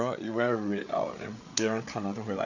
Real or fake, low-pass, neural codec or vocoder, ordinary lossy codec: fake; 7.2 kHz; codec, 16 kHz, 8 kbps, FreqCodec, larger model; none